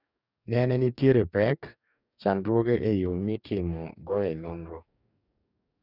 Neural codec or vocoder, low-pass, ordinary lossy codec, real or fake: codec, 44.1 kHz, 2.6 kbps, DAC; 5.4 kHz; none; fake